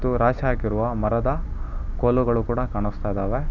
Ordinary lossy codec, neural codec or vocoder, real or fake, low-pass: none; none; real; 7.2 kHz